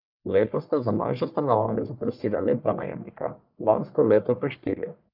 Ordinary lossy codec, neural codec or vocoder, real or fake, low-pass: none; codec, 44.1 kHz, 1.7 kbps, Pupu-Codec; fake; 5.4 kHz